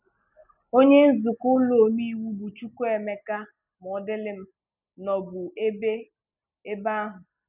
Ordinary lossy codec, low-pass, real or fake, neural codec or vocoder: none; 3.6 kHz; real; none